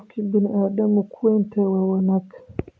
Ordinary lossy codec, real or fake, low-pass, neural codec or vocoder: none; real; none; none